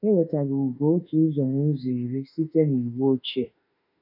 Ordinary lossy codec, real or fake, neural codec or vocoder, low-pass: none; fake; autoencoder, 48 kHz, 32 numbers a frame, DAC-VAE, trained on Japanese speech; 5.4 kHz